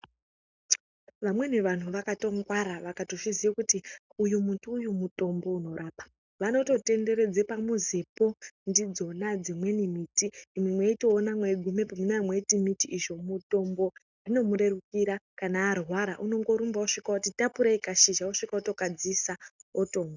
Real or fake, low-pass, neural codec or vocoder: real; 7.2 kHz; none